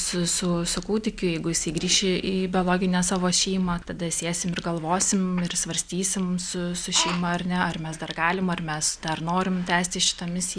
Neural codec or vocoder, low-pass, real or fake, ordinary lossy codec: none; 9.9 kHz; real; Opus, 64 kbps